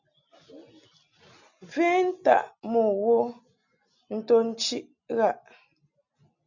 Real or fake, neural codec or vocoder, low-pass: real; none; 7.2 kHz